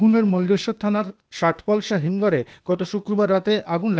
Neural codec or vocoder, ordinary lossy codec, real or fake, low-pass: codec, 16 kHz, 0.8 kbps, ZipCodec; none; fake; none